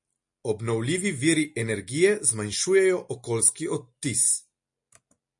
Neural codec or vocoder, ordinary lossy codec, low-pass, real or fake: none; MP3, 48 kbps; 10.8 kHz; real